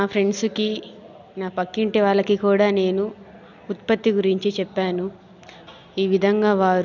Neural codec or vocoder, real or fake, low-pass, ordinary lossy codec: none; real; 7.2 kHz; none